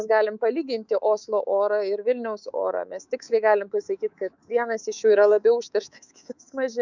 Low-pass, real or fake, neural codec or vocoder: 7.2 kHz; fake; codec, 24 kHz, 3.1 kbps, DualCodec